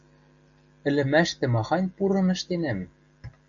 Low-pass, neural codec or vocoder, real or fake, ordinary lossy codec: 7.2 kHz; none; real; MP3, 64 kbps